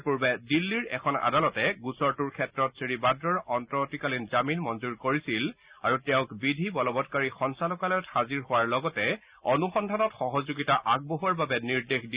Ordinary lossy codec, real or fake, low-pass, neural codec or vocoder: Opus, 64 kbps; real; 3.6 kHz; none